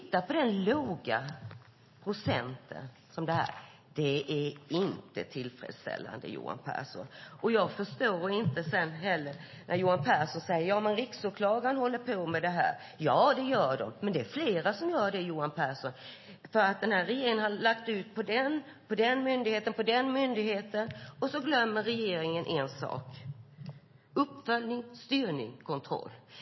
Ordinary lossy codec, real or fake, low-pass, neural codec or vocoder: MP3, 24 kbps; real; 7.2 kHz; none